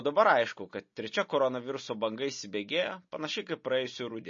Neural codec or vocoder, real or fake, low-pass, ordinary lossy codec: none; real; 7.2 kHz; MP3, 32 kbps